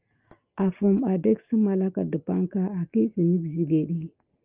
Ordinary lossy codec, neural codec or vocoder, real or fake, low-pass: Opus, 24 kbps; none; real; 3.6 kHz